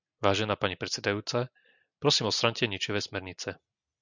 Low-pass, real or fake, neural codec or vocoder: 7.2 kHz; real; none